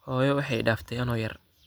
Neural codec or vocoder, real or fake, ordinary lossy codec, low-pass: none; real; none; none